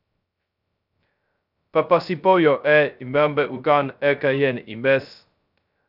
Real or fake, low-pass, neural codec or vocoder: fake; 5.4 kHz; codec, 16 kHz, 0.2 kbps, FocalCodec